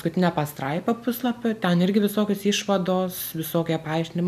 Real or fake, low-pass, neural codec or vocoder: real; 14.4 kHz; none